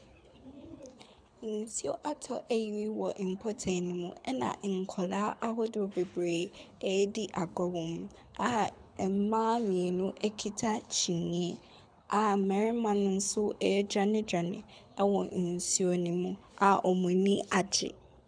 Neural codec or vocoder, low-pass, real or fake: codec, 24 kHz, 6 kbps, HILCodec; 9.9 kHz; fake